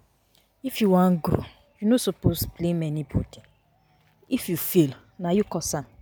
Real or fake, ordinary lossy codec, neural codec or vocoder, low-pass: real; none; none; none